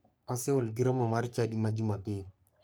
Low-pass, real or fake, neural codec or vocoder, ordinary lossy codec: none; fake; codec, 44.1 kHz, 3.4 kbps, Pupu-Codec; none